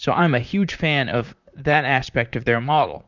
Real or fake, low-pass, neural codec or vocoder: fake; 7.2 kHz; vocoder, 44.1 kHz, 128 mel bands, Pupu-Vocoder